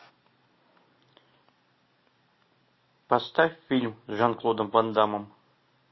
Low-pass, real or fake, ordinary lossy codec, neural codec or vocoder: 7.2 kHz; real; MP3, 24 kbps; none